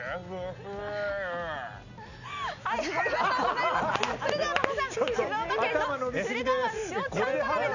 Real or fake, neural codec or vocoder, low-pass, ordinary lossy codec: real; none; 7.2 kHz; none